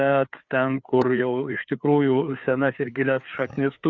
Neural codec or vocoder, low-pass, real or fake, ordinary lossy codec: codec, 16 kHz, 4 kbps, FreqCodec, larger model; 7.2 kHz; fake; Opus, 64 kbps